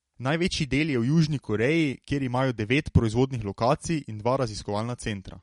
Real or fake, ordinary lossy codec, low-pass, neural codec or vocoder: real; MP3, 48 kbps; 19.8 kHz; none